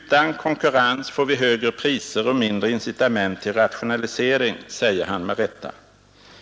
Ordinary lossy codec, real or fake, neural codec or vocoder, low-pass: none; real; none; none